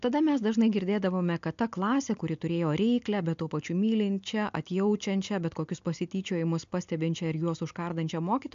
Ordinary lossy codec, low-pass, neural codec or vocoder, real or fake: AAC, 64 kbps; 7.2 kHz; none; real